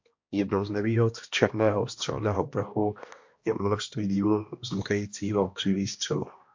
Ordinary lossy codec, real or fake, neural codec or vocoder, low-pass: MP3, 48 kbps; fake; codec, 16 kHz, 1 kbps, X-Codec, HuBERT features, trained on balanced general audio; 7.2 kHz